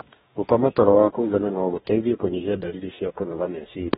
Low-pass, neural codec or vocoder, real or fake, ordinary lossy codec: 19.8 kHz; codec, 44.1 kHz, 2.6 kbps, DAC; fake; AAC, 16 kbps